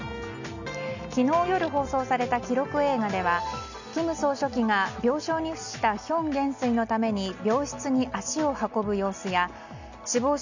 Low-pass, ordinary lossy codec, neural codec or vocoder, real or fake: 7.2 kHz; none; none; real